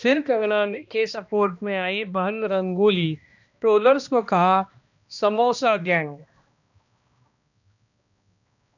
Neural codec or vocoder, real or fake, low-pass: codec, 16 kHz, 1 kbps, X-Codec, HuBERT features, trained on balanced general audio; fake; 7.2 kHz